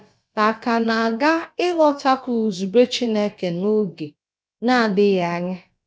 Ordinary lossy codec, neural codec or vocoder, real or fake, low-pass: none; codec, 16 kHz, about 1 kbps, DyCAST, with the encoder's durations; fake; none